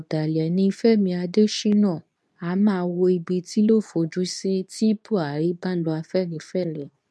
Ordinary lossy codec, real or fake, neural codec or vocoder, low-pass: none; fake; codec, 24 kHz, 0.9 kbps, WavTokenizer, medium speech release version 2; none